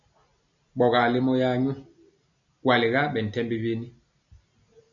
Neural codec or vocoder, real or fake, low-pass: none; real; 7.2 kHz